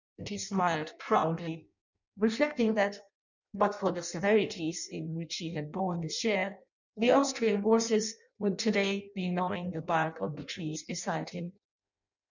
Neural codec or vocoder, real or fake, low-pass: codec, 16 kHz in and 24 kHz out, 0.6 kbps, FireRedTTS-2 codec; fake; 7.2 kHz